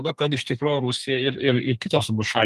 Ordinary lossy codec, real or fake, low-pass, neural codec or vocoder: Opus, 32 kbps; fake; 14.4 kHz; codec, 32 kHz, 1.9 kbps, SNAC